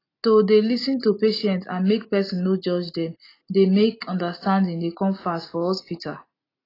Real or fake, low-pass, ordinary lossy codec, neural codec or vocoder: real; 5.4 kHz; AAC, 24 kbps; none